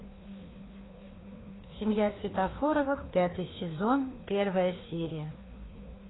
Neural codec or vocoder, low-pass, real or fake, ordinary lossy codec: codec, 16 kHz, 2 kbps, FreqCodec, larger model; 7.2 kHz; fake; AAC, 16 kbps